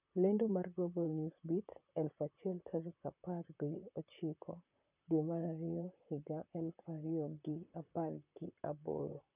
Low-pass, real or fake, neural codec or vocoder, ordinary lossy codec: 3.6 kHz; fake; vocoder, 44.1 kHz, 128 mel bands, Pupu-Vocoder; none